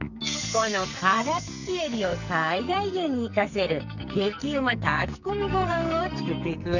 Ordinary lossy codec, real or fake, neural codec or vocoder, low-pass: none; fake; codec, 44.1 kHz, 2.6 kbps, SNAC; 7.2 kHz